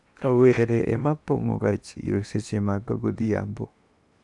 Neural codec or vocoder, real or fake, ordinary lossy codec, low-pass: codec, 16 kHz in and 24 kHz out, 0.8 kbps, FocalCodec, streaming, 65536 codes; fake; none; 10.8 kHz